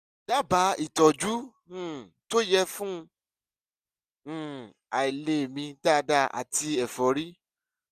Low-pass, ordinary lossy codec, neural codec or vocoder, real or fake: 14.4 kHz; none; none; real